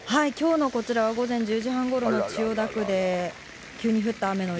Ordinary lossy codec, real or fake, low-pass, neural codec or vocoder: none; real; none; none